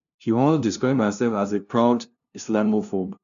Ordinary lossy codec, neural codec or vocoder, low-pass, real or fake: none; codec, 16 kHz, 0.5 kbps, FunCodec, trained on LibriTTS, 25 frames a second; 7.2 kHz; fake